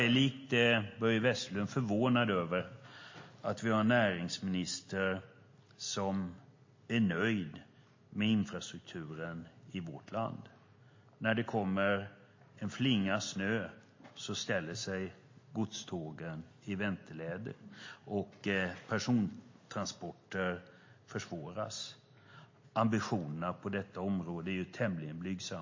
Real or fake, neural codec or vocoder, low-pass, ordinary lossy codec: real; none; 7.2 kHz; MP3, 32 kbps